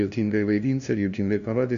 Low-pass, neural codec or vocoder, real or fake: 7.2 kHz; codec, 16 kHz, 0.5 kbps, FunCodec, trained on LibriTTS, 25 frames a second; fake